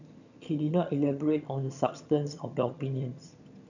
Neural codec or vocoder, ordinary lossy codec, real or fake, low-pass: vocoder, 22.05 kHz, 80 mel bands, HiFi-GAN; none; fake; 7.2 kHz